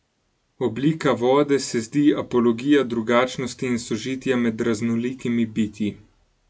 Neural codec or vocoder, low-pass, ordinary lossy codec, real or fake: none; none; none; real